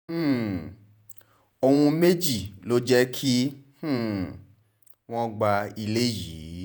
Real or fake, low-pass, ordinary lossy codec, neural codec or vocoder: fake; none; none; vocoder, 48 kHz, 128 mel bands, Vocos